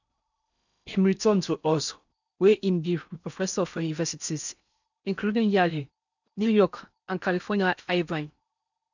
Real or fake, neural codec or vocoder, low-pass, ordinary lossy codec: fake; codec, 16 kHz in and 24 kHz out, 0.8 kbps, FocalCodec, streaming, 65536 codes; 7.2 kHz; none